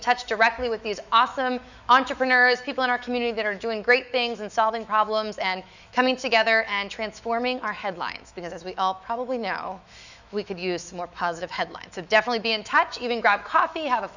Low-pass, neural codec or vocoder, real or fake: 7.2 kHz; autoencoder, 48 kHz, 128 numbers a frame, DAC-VAE, trained on Japanese speech; fake